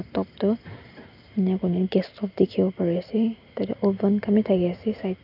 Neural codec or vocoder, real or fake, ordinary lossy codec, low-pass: none; real; none; 5.4 kHz